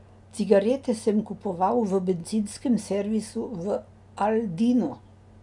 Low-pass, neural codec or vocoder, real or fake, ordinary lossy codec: 10.8 kHz; none; real; none